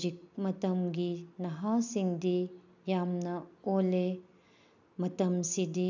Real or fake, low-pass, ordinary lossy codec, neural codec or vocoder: real; 7.2 kHz; none; none